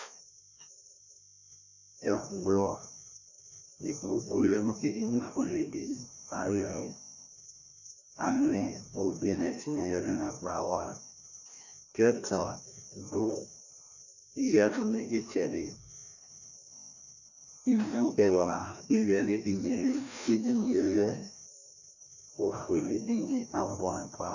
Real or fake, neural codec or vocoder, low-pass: fake; codec, 16 kHz, 1 kbps, FreqCodec, larger model; 7.2 kHz